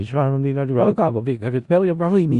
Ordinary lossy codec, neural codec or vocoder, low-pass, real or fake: Opus, 64 kbps; codec, 16 kHz in and 24 kHz out, 0.4 kbps, LongCat-Audio-Codec, four codebook decoder; 10.8 kHz; fake